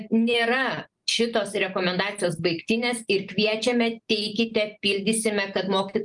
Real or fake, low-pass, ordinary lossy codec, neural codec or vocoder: real; 10.8 kHz; Opus, 32 kbps; none